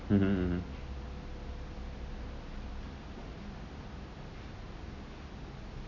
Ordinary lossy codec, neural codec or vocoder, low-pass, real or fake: none; none; 7.2 kHz; real